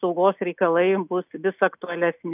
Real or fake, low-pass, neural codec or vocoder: real; 3.6 kHz; none